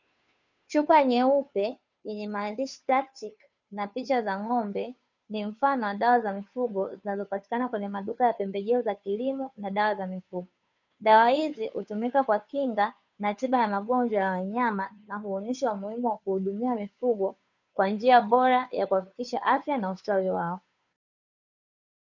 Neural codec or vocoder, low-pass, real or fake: codec, 16 kHz, 2 kbps, FunCodec, trained on Chinese and English, 25 frames a second; 7.2 kHz; fake